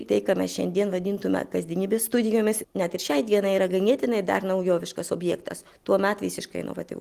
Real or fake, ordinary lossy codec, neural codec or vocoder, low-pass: real; Opus, 24 kbps; none; 14.4 kHz